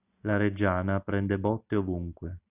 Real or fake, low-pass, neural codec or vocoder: real; 3.6 kHz; none